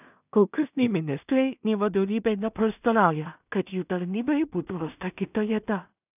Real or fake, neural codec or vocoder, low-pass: fake; codec, 16 kHz in and 24 kHz out, 0.4 kbps, LongCat-Audio-Codec, two codebook decoder; 3.6 kHz